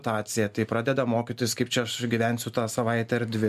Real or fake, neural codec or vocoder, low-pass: real; none; 14.4 kHz